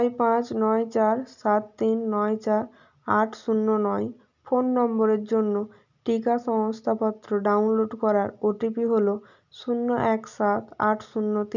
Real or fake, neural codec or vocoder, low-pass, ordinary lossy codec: real; none; 7.2 kHz; none